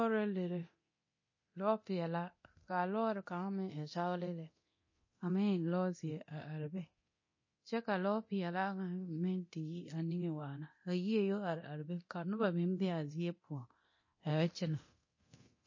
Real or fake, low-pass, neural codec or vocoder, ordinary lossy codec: fake; 7.2 kHz; codec, 24 kHz, 0.9 kbps, DualCodec; MP3, 32 kbps